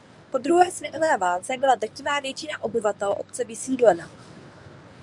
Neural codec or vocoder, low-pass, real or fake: codec, 24 kHz, 0.9 kbps, WavTokenizer, medium speech release version 1; 10.8 kHz; fake